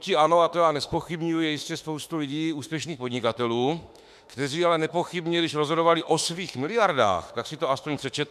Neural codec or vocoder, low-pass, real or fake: autoencoder, 48 kHz, 32 numbers a frame, DAC-VAE, trained on Japanese speech; 14.4 kHz; fake